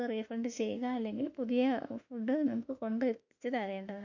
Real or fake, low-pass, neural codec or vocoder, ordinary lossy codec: fake; 7.2 kHz; autoencoder, 48 kHz, 32 numbers a frame, DAC-VAE, trained on Japanese speech; none